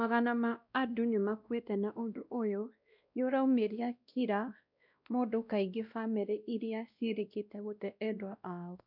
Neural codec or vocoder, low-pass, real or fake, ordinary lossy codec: codec, 16 kHz, 1 kbps, X-Codec, WavLM features, trained on Multilingual LibriSpeech; 5.4 kHz; fake; none